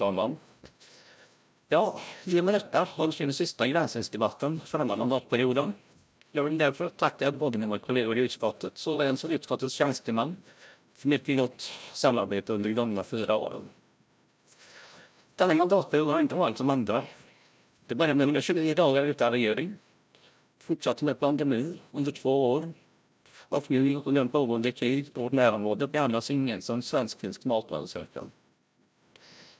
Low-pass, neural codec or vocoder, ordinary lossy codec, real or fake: none; codec, 16 kHz, 0.5 kbps, FreqCodec, larger model; none; fake